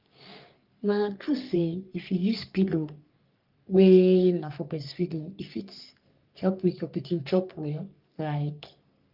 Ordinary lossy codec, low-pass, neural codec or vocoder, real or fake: Opus, 32 kbps; 5.4 kHz; codec, 44.1 kHz, 3.4 kbps, Pupu-Codec; fake